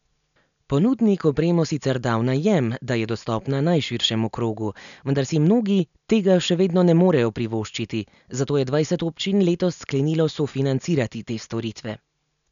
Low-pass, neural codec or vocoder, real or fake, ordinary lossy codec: 7.2 kHz; none; real; none